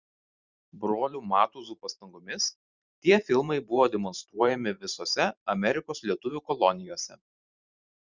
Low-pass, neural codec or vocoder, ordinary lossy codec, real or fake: 7.2 kHz; vocoder, 44.1 kHz, 128 mel bands every 512 samples, BigVGAN v2; Opus, 64 kbps; fake